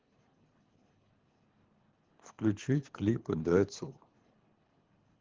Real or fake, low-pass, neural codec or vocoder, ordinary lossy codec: fake; 7.2 kHz; codec, 24 kHz, 3 kbps, HILCodec; Opus, 16 kbps